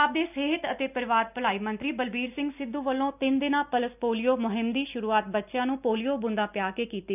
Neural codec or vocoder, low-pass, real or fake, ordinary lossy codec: none; 3.6 kHz; real; none